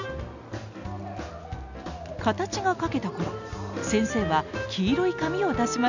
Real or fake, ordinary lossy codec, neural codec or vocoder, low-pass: real; none; none; 7.2 kHz